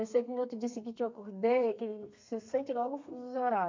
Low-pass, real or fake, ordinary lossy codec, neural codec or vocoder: 7.2 kHz; fake; MP3, 64 kbps; codec, 32 kHz, 1.9 kbps, SNAC